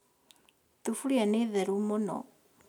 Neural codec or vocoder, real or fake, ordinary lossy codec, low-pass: none; real; none; 19.8 kHz